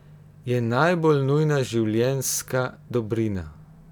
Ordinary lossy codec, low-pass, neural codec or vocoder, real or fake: none; 19.8 kHz; none; real